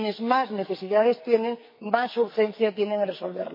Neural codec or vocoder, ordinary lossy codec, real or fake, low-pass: codec, 44.1 kHz, 2.6 kbps, SNAC; MP3, 24 kbps; fake; 5.4 kHz